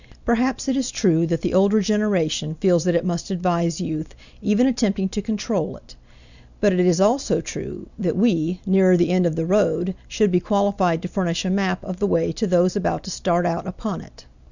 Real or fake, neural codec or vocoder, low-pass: real; none; 7.2 kHz